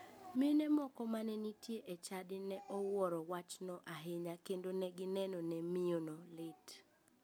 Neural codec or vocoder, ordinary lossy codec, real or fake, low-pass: none; none; real; none